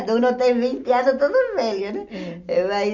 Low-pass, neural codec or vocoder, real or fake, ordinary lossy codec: 7.2 kHz; none; real; none